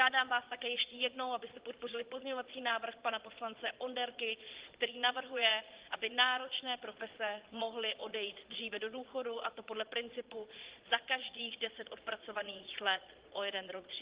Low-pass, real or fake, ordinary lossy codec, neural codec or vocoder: 3.6 kHz; fake; Opus, 16 kbps; vocoder, 22.05 kHz, 80 mel bands, Vocos